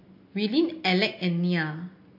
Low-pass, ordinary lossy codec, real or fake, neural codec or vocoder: 5.4 kHz; MP3, 32 kbps; real; none